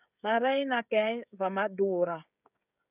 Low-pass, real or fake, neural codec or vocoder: 3.6 kHz; fake; codec, 16 kHz, 16 kbps, FreqCodec, smaller model